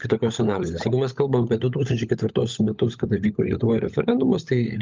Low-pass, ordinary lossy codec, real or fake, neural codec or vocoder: 7.2 kHz; Opus, 24 kbps; fake; codec, 16 kHz, 16 kbps, FunCodec, trained on LibriTTS, 50 frames a second